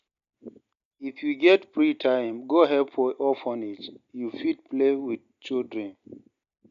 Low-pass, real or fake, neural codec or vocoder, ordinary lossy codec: 7.2 kHz; real; none; none